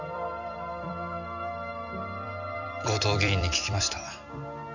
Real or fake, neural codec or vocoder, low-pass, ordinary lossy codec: fake; vocoder, 44.1 kHz, 128 mel bands every 256 samples, BigVGAN v2; 7.2 kHz; none